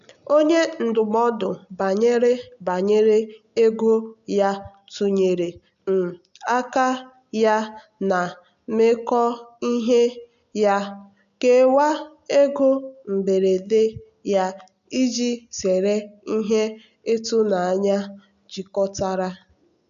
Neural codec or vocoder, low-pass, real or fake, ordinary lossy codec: none; 7.2 kHz; real; none